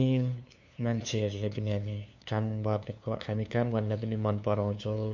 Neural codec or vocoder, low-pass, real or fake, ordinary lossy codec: codec, 16 kHz, 2 kbps, FunCodec, trained on LibriTTS, 25 frames a second; 7.2 kHz; fake; MP3, 48 kbps